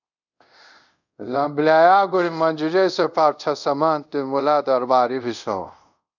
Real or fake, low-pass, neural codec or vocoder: fake; 7.2 kHz; codec, 24 kHz, 0.5 kbps, DualCodec